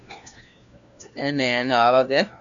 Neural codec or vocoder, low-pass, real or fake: codec, 16 kHz, 1 kbps, FunCodec, trained on LibriTTS, 50 frames a second; 7.2 kHz; fake